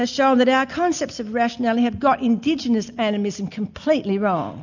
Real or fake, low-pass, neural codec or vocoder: real; 7.2 kHz; none